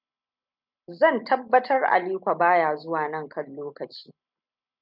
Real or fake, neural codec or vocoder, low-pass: real; none; 5.4 kHz